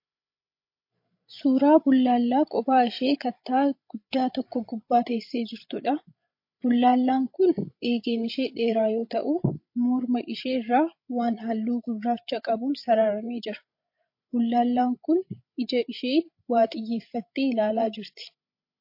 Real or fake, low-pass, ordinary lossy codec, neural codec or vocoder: fake; 5.4 kHz; MP3, 32 kbps; codec, 16 kHz, 16 kbps, FreqCodec, larger model